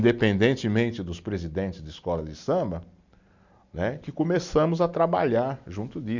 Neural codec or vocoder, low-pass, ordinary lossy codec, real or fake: none; 7.2 kHz; none; real